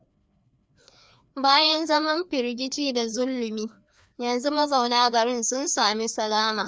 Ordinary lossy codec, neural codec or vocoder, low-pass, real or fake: none; codec, 16 kHz, 2 kbps, FreqCodec, larger model; none; fake